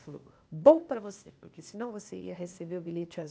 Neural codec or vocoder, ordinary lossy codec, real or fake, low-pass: codec, 16 kHz, 0.8 kbps, ZipCodec; none; fake; none